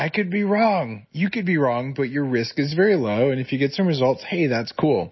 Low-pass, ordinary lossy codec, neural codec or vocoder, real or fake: 7.2 kHz; MP3, 24 kbps; none; real